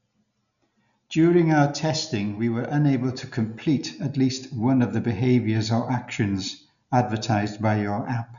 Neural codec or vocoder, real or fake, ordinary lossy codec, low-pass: none; real; none; 7.2 kHz